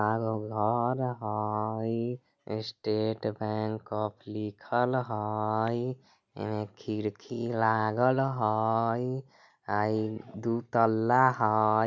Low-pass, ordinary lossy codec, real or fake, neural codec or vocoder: 7.2 kHz; none; real; none